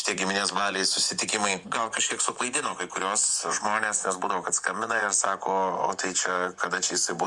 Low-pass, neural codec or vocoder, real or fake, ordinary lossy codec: 10.8 kHz; vocoder, 24 kHz, 100 mel bands, Vocos; fake; Opus, 64 kbps